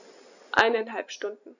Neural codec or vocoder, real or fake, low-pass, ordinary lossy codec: none; real; 7.2 kHz; none